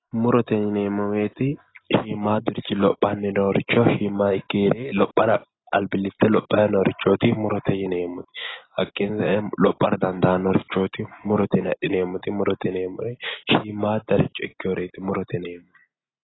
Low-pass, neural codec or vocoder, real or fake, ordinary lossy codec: 7.2 kHz; none; real; AAC, 16 kbps